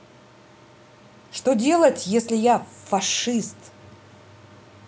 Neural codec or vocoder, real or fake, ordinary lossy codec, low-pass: none; real; none; none